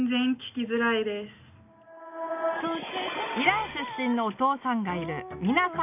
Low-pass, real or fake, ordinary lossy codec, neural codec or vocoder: 3.6 kHz; real; none; none